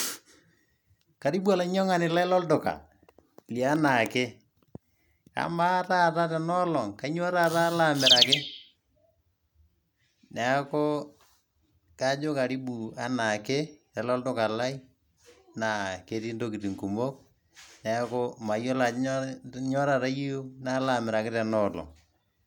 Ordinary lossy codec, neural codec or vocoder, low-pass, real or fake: none; none; none; real